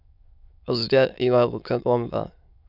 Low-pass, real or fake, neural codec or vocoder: 5.4 kHz; fake; autoencoder, 22.05 kHz, a latent of 192 numbers a frame, VITS, trained on many speakers